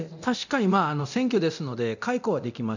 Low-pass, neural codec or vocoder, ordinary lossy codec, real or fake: 7.2 kHz; codec, 24 kHz, 0.9 kbps, DualCodec; none; fake